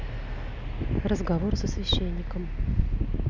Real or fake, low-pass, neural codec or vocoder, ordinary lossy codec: real; 7.2 kHz; none; none